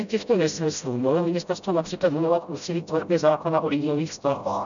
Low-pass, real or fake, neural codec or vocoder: 7.2 kHz; fake; codec, 16 kHz, 0.5 kbps, FreqCodec, smaller model